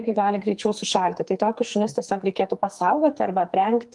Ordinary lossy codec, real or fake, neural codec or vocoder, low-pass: Opus, 32 kbps; fake; codec, 44.1 kHz, 2.6 kbps, SNAC; 10.8 kHz